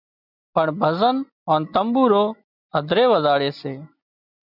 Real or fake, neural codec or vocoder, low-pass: real; none; 5.4 kHz